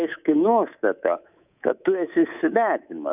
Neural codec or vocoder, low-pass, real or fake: codec, 24 kHz, 3.1 kbps, DualCodec; 3.6 kHz; fake